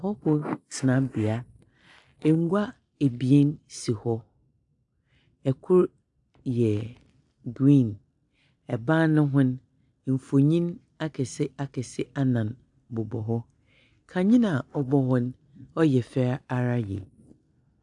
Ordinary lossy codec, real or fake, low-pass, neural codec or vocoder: AAC, 64 kbps; real; 10.8 kHz; none